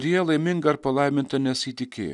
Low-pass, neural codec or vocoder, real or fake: 10.8 kHz; none; real